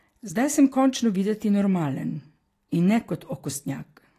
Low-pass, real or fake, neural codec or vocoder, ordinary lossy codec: 14.4 kHz; real; none; AAC, 48 kbps